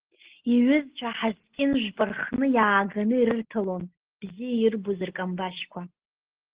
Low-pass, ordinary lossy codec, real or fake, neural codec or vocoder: 3.6 kHz; Opus, 16 kbps; real; none